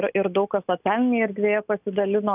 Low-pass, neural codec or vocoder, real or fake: 3.6 kHz; none; real